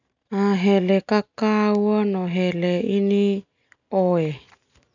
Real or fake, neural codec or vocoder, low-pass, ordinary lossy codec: real; none; 7.2 kHz; none